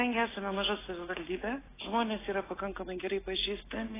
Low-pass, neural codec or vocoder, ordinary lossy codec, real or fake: 3.6 kHz; none; AAC, 16 kbps; real